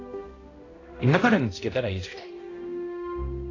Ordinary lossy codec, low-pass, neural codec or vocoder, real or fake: AAC, 32 kbps; 7.2 kHz; codec, 16 kHz, 0.5 kbps, X-Codec, HuBERT features, trained on balanced general audio; fake